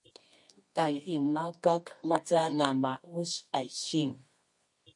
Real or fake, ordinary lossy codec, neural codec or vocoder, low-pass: fake; MP3, 48 kbps; codec, 24 kHz, 0.9 kbps, WavTokenizer, medium music audio release; 10.8 kHz